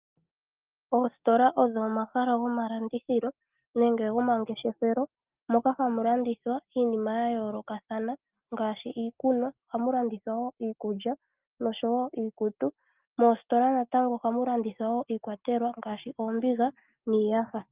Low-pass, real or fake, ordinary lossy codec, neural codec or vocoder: 3.6 kHz; real; Opus, 32 kbps; none